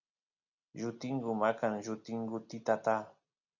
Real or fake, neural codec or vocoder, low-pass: real; none; 7.2 kHz